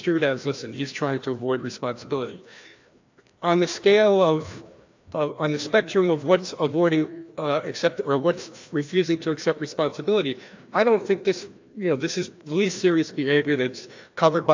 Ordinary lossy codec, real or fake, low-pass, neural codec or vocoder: AAC, 48 kbps; fake; 7.2 kHz; codec, 16 kHz, 1 kbps, FreqCodec, larger model